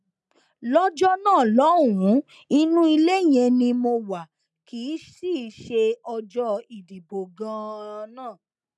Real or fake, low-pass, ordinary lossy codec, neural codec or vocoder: real; none; none; none